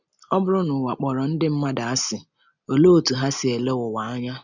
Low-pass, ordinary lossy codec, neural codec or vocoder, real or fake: 7.2 kHz; none; none; real